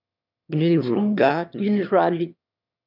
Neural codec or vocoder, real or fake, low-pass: autoencoder, 22.05 kHz, a latent of 192 numbers a frame, VITS, trained on one speaker; fake; 5.4 kHz